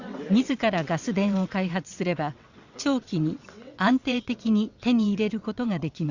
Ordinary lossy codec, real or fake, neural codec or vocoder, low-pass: Opus, 64 kbps; fake; vocoder, 22.05 kHz, 80 mel bands, WaveNeXt; 7.2 kHz